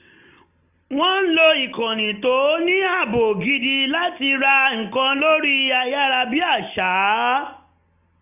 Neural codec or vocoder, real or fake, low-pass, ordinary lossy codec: none; real; 3.6 kHz; none